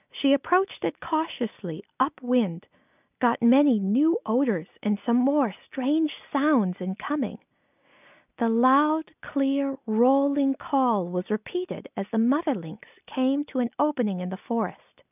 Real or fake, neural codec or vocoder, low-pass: real; none; 3.6 kHz